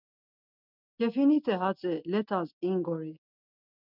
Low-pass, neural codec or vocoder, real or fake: 5.4 kHz; none; real